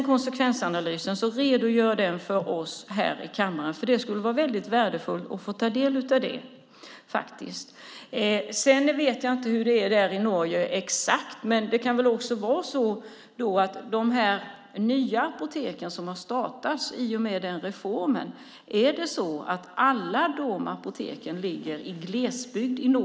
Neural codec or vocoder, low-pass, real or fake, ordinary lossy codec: none; none; real; none